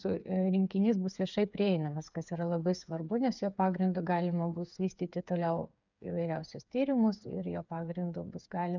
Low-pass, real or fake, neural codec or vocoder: 7.2 kHz; fake; codec, 16 kHz, 8 kbps, FreqCodec, smaller model